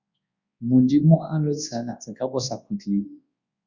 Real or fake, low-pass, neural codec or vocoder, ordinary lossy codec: fake; 7.2 kHz; codec, 24 kHz, 0.9 kbps, WavTokenizer, large speech release; Opus, 64 kbps